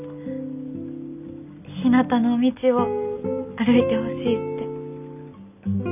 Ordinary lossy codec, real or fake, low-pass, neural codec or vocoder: none; real; 3.6 kHz; none